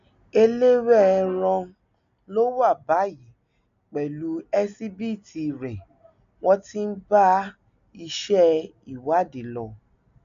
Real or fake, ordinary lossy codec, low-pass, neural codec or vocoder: real; none; 7.2 kHz; none